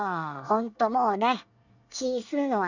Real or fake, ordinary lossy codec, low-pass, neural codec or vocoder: fake; none; 7.2 kHz; codec, 24 kHz, 1 kbps, SNAC